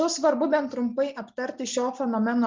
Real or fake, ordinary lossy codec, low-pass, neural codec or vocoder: real; Opus, 32 kbps; 7.2 kHz; none